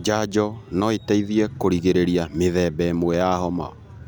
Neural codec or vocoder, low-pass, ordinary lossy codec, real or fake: none; none; none; real